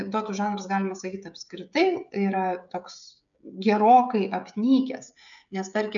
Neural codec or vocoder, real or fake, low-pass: codec, 16 kHz, 16 kbps, FreqCodec, smaller model; fake; 7.2 kHz